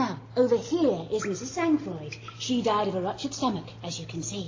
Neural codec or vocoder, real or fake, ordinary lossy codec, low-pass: none; real; AAC, 32 kbps; 7.2 kHz